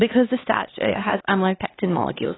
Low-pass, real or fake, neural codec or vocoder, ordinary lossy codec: 7.2 kHz; fake; codec, 16 kHz, 2 kbps, X-Codec, HuBERT features, trained on LibriSpeech; AAC, 16 kbps